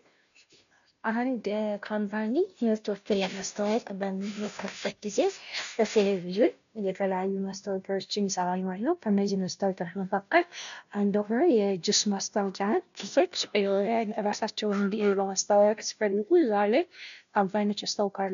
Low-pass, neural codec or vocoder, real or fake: 7.2 kHz; codec, 16 kHz, 0.5 kbps, FunCodec, trained on Chinese and English, 25 frames a second; fake